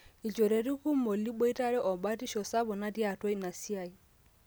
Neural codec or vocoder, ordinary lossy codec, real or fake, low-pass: none; none; real; none